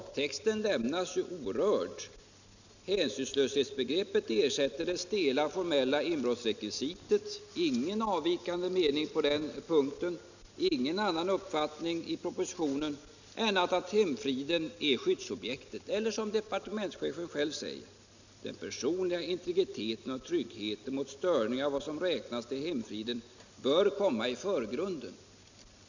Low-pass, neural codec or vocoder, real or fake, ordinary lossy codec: 7.2 kHz; none; real; none